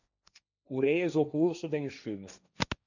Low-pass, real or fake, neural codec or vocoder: 7.2 kHz; fake; codec, 16 kHz, 1.1 kbps, Voila-Tokenizer